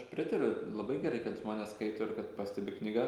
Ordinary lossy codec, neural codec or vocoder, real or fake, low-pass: Opus, 32 kbps; none; real; 19.8 kHz